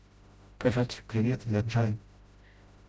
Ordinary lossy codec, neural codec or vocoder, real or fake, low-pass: none; codec, 16 kHz, 0.5 kbps, FreqCodec, smaller model; fake; none